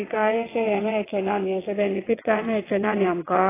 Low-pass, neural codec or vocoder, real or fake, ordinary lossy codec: 3.6 kHz; vocoder, 22.05 kHz, 80 mel bands, WaveNeXt; fake; AAC, 16 kbps